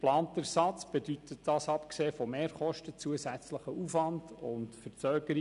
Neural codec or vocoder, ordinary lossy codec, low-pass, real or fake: none; none; 10.8 kHz; real